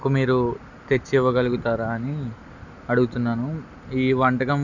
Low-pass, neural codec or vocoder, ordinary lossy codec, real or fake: 7.2 kHz; none; none; real